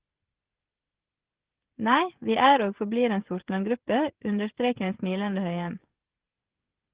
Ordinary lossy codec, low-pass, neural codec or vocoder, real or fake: Opus, 16 kbps; 3.6 kHz; codec, 16 kHz, 16 kbps, FreqCodec, smaller model; fake